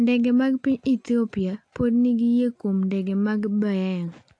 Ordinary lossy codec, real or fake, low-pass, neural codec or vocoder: AAC, 48 kbps; real; 9.9 kHz; none